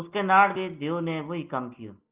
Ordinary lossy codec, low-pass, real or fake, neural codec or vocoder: Opus, 32 kbps; 3.6 kHz; real; none